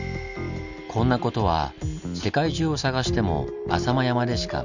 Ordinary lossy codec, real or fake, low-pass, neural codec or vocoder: none; real; 7.2 kHz; none